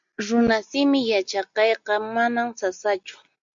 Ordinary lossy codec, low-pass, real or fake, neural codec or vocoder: AAC, 64 kbps; 7.2 kHz; real; none